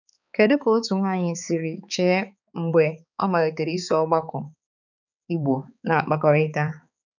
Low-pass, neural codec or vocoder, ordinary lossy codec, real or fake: 7.2 kHz; codec, 16 kHz, 4 kbps, X-Codec, HuBERT features, trained on balanced general audio; none; fake